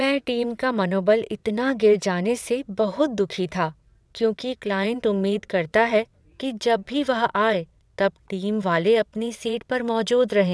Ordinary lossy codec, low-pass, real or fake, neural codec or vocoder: none; none; fake; vocoder, 22.05 kHz, 80 mel bands, WaveNeXt